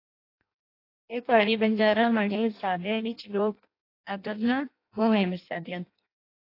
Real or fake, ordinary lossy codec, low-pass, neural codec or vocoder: fake; AAC, 32 kbps; 5.4 kHz; codec, 16 kHz in and 24 kHz out, 0.6 kbps, FireRedTTS-2 codec